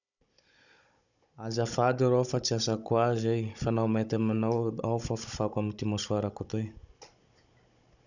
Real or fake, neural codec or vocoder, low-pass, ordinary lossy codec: fake; codec, 16 kHz, 16 kbps, FunCodec, trained on Chinese and English, 50 frames a second; 7.2 kHz; none